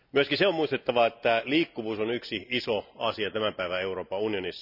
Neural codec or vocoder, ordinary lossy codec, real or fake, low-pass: none; none; real; 5.4 kHz